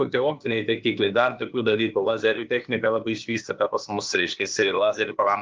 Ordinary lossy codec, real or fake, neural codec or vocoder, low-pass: Opus, 32 kbps; fake; codec, 16 kHz, 0.8 kbps, ZipCodec; 7.2 kHz